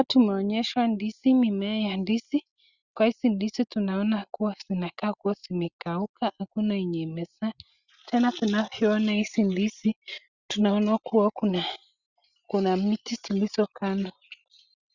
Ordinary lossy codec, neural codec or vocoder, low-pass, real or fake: Opus, 64 kbps; none; 7.2 kHz; real